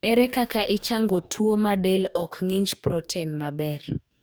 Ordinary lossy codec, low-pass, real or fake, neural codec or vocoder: none; none; fake; codec, 44.1 kHz, 2.6 kbps, DAC